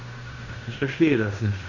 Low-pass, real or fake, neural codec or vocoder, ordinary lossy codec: 7.2 kHz; fake; codec, 16 kHz in and 24 kHz out, 0.9 kbps, LongCat-Audio-Codec, fine tuned four codebook decoder; none